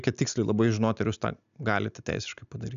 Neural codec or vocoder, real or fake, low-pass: none; real; 7.2 kHz